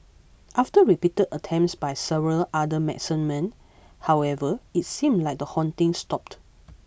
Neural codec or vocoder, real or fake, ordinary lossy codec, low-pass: none; real; none; none